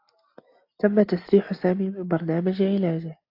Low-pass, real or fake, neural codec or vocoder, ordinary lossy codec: 5.4 kHz; real; none; AAC, 32 kbps